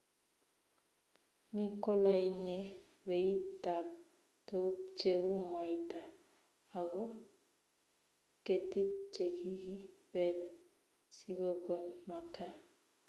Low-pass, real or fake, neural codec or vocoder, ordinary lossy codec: 19.8 kHz; fake; autoencoder, 48 kHz, 32 numbers a frame, DAC-VAE, trained on Japanese speech; Opus, 32 kbps